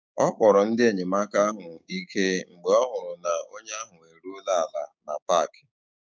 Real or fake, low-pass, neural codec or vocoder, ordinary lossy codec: fake; none; codec, 16 kHz, 6 kbps, DAC; none